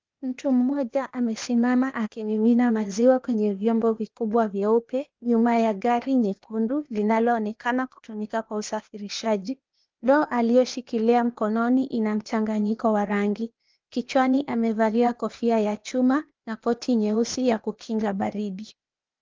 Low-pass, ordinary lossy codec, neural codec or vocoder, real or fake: 7.2 kHz; Opus, 32 kbps; codec, 16 kHz, 0.8 kbps, ZipCodec; fake